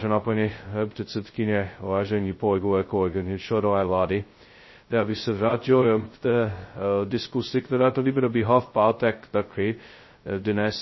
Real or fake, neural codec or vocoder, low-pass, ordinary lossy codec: fake; codec, 16 kHz, 0.2 kbps, FocalCodec; 7.2 kHz; MP3, 24 kbps